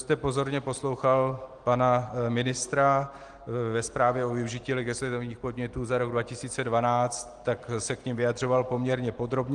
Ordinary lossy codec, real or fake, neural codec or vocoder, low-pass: Opus, 24 kbps; real; none; 9.9 kHz